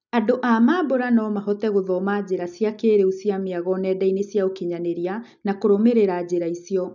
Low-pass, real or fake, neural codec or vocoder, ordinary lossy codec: 7.2 kHz; real; none; none